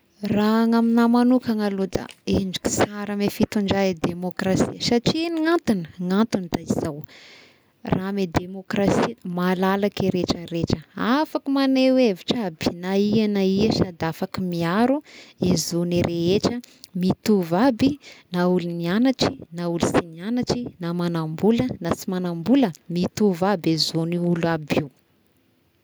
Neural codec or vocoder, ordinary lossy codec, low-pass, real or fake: none; none; none; real